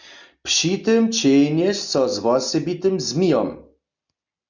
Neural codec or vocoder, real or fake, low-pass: none; real; 7.2 kHz